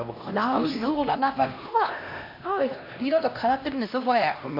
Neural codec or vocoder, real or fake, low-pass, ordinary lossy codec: codec, 16 kHz, 1 kbps, X-Codec, HuBERT features, trained on LibriSpeech; fake; 5.4 kHz; MP3, 48 kbps